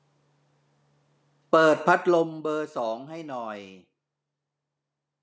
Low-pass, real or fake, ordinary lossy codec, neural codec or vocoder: none; real; none; none